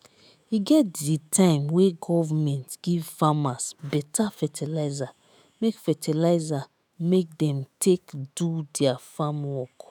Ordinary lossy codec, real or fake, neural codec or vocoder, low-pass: none; fake; autoencoder, 48 kHz, 128 numbers a frame, DAC-VAE, trained on Japanese speech; none